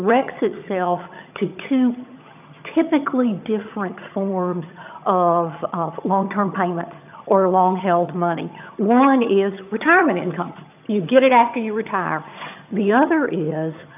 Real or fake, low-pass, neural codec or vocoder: fake; 3.6 kHz; vocoder, 22.05 kHz, 80 mel bands, HiFi-GAN